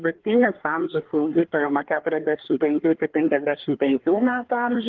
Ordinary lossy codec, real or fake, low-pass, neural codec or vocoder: Opus, 16 kbps; fake; 7.2 kHz; codec, 24 kHz, 1 kbps, SNAC